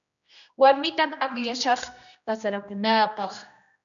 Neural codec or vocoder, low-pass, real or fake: codec, 16 kHz, 1 kbps, X-Codec, HuBERT features, trained on general audio; 7.2 kHz; fake